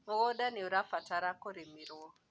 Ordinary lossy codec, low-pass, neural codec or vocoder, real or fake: none; none; none; real